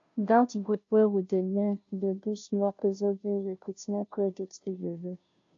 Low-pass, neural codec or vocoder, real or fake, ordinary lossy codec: 7.2 kHz; codec, 16 kHz, 0.5 kbps, FunCodec, trained on Chinese and English, 25 frames a second; fake; AAC, 48 kbps